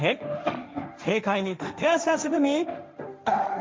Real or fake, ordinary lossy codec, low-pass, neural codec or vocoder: fake; none; none; codec, 16 kHz, 1.1 kbps, Voila-Tokenizer